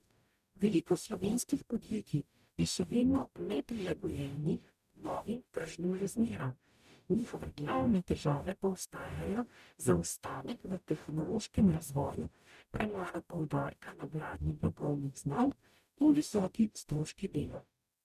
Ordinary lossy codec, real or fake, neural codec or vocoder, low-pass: none; fake; codec, 44.1 kHz, 0.9 kbps, DAC; 14.4 kHz